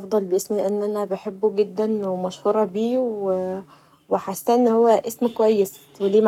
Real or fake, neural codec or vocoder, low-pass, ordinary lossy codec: fake; codec, 44.1 kHz, 7.8 kbps, Pupu-Codec; 19.8 kHz; none